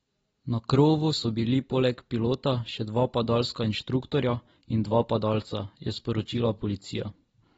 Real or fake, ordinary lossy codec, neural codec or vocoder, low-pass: real; AAC, 24 kbps; none; 19.8 kHz